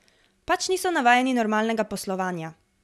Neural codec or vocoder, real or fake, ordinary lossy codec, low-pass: none; real; none; none